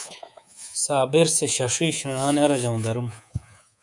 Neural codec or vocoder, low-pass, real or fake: codec, 24 kHz, 3.1 kbps, DualCodec; 10.8 kHz; fake